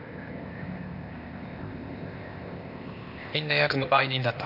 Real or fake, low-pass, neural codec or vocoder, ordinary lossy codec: fake; 5.4 kHz; codec, 16 kHz, 0.8 kbps, ZipCodec; none